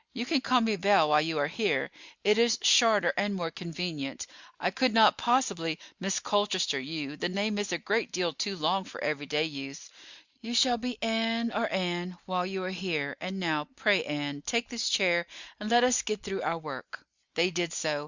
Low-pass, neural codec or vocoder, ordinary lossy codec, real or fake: 7.2 kHz; none; Opus, 64 kbps; real